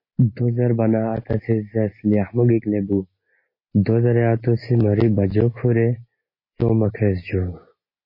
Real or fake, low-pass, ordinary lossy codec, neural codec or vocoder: real; 5.4 kHz; MP3, 24 kbps; none